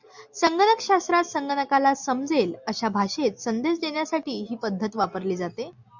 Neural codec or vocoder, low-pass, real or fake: none; 7.2 kHz; real